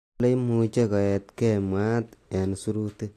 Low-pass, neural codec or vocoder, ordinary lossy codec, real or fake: 14.4 kHz; none; AAC, 64 kbps; real